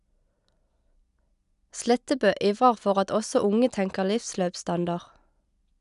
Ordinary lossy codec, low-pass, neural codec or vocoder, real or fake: none; 10.8 kHz; none; real